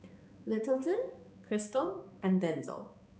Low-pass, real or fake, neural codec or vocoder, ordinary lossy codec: none; fake; codec, 16 kHz, 2 kbps, X-Codec, HuBERT features, trained on balanced general audio; none